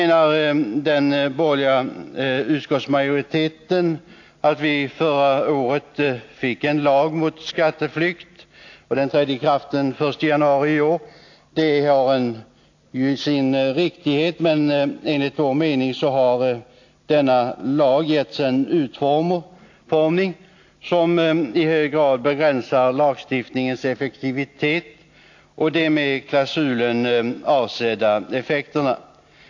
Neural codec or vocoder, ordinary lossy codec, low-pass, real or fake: none; AAC, 48 kbps; 7.2 kHz; real